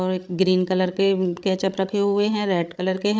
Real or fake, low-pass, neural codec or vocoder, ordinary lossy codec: fake; none; codec, 16 kHz, 16 kbps, FreqCodec, larger model; none